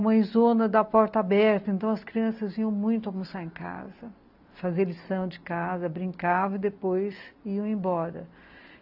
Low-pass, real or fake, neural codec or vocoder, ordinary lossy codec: 5.4 kHz; real; none; AAC, 48 kbps